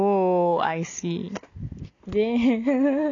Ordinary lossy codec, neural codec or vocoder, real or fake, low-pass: none; none; real; 7.2 kHz